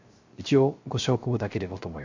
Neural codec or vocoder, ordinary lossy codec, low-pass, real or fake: codec, 16 kHz, 0.3 kbps, FocalCodec; MP3, 48 kbps; 7.2 kHz; fake